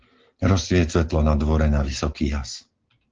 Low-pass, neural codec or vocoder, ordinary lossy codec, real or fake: 7.2 kHz; none; Opus, 16 kbps; real